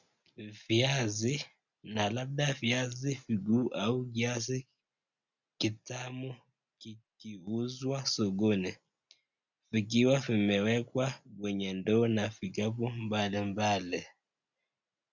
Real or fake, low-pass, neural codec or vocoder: real; 7.2 kHz; none